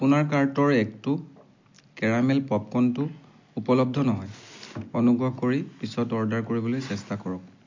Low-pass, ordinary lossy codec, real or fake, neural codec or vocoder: 7.2 kHz; MP3, 48 kbps; real; none